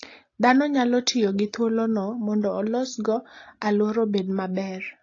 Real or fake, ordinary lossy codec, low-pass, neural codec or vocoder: real; AAC, 32 kbps; 7.2 kHz; none